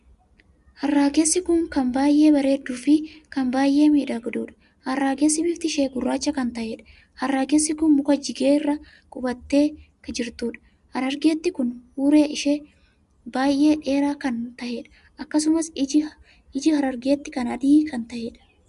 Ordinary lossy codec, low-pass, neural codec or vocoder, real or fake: Opus, 64 kbps; 10.8 kHz; none; real